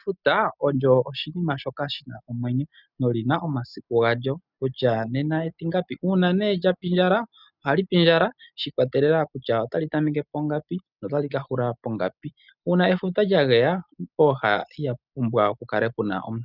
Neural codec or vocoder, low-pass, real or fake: none; 5.4 kHz; real